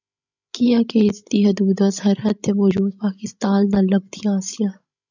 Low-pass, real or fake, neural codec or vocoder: 7.2 kHz; fake; codec, 16 kHz, 16 kbps, FreqCodec, larger model